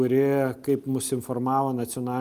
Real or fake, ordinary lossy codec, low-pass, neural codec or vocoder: real; Opus, 32 kbps; 14.4 kHz; none